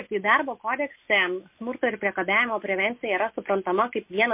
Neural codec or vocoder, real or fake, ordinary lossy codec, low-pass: none; real; MP3, 32 kbps; 3.6 kHz